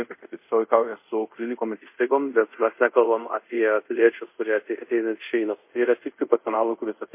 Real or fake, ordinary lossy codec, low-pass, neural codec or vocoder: fake; MP3, 24 kbps; 3.6 kHz; codec, 24 kHz, 0.5 kbps, DualCodec